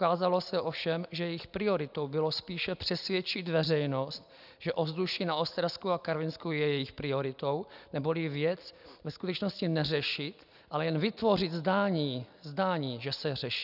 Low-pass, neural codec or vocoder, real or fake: 5.4 kHz; none; real